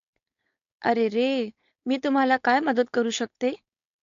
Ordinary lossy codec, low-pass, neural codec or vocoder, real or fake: AAC, 48 kbps; 7.2 kHz; codec, 16 kHz, 4.8 kbps, FACodec; fake